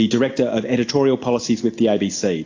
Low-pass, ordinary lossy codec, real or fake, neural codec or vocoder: 7.2 kHz; AAC, 48 kbps; real; none